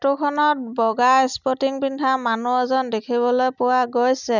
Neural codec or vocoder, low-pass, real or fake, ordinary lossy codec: none; 7.2 kHz; real; none